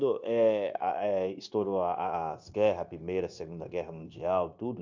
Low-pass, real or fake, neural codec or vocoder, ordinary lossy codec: 7.2 kHz; fake; codec, 16 kHz, 0.9 kbps, LongCat-Audio-Codec; MP3, 64 kbps